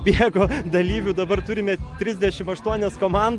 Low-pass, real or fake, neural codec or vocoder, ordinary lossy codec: 10.8 kHz; real; none; Opus, 24 kbps